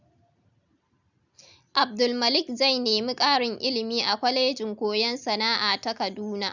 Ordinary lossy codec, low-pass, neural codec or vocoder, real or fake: none; 7.2 kHz; none; real